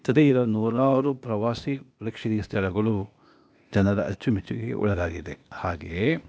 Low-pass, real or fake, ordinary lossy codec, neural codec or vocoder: none; fake; none; codec, 16 kHz, 0.8 kbps, ZipCodec